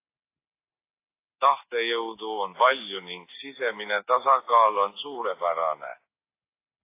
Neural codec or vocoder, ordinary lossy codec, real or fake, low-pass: none; AAC, 24 kbps; real; 3.6 kHz